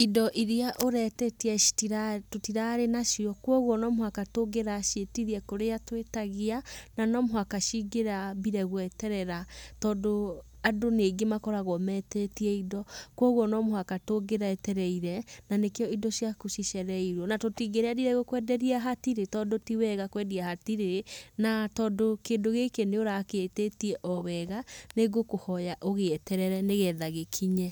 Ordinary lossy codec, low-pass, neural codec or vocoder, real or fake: none; none; none; real